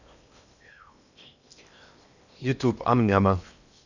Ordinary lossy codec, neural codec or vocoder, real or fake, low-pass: none; codec, 16 kHz in and 24 kHz out, 0.8 kbps, FocalCodec, streaming, 65536 codes; fake; 7.2 kHz